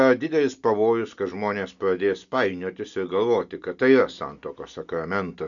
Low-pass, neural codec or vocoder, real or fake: 7.2 kHz; none; real